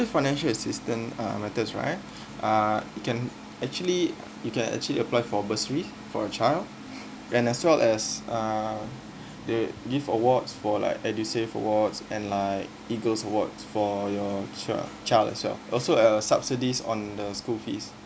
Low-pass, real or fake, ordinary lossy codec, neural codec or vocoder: none; real; none; none